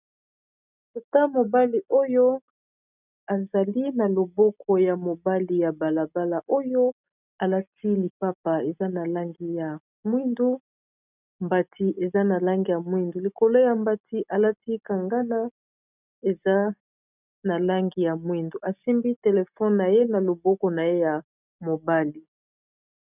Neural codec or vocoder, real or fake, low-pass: none; real; 3.6 kHz